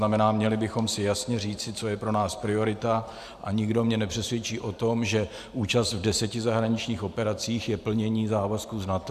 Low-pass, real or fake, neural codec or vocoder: 14.4 kHz; fake; vocoder, 44.1 kHz, 128 mel bands every 512 samples, BigVGAN v2